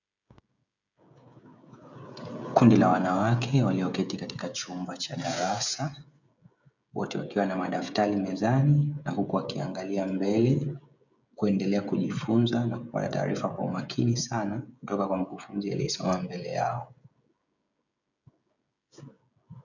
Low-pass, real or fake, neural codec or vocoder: 7.2 kHz; fake; codec, 16 kHz, 16 kbps, FreqCodec, smaller model